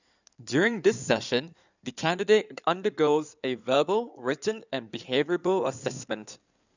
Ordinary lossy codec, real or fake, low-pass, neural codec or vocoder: none; fake; 7.2 kHz; codec, 16 kHz in and 24 kHz out, 2.2 kbps, FireRedTTS-2 codec